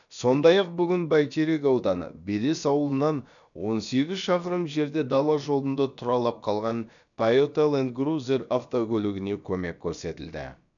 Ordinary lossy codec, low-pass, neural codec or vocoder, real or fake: none; 7.2 kHz; codec, 16 kHz, about 1 kbps, DyCAST, with the encoder's durations; fake